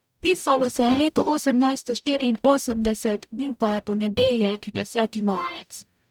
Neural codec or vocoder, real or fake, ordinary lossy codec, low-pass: codec, 44.1 kHz, 0.9 kbps, DAC; fake; none; 19.8 kHz